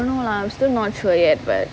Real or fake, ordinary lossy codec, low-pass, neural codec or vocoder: real; none; none; none